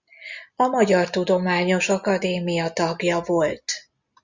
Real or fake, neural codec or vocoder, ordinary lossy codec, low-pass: real; none; Opus, 64 kbps; 7.2 kHz